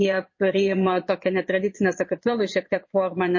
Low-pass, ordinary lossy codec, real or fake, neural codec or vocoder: 7.2 kHz; MP3, 32 kbps; fake; vocoder, 22.05 kHz, 80 mel bands, Vocos